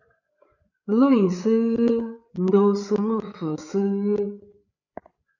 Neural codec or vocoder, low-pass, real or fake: codec, 16 kHz, 8 kbps, FreqCodec, larger model; 7.2 kHz; fake